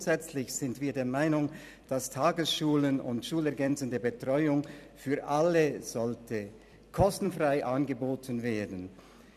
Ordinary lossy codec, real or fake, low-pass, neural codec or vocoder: none; fake; 14.4 kHz; vocoder, 44.1 kHz, 128 mel bands every 256 samples, BigVGAN v2